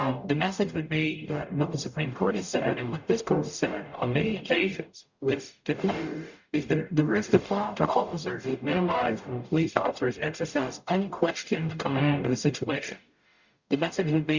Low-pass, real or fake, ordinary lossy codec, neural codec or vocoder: 7.2 kHz; fake; Opus, 64 kbps; codec, 44.1 kHz, 0.9 kbps, DAC